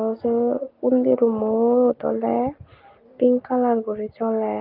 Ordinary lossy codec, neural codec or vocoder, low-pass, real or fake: Opus, 32 kbps; none; 5.4 kHz; real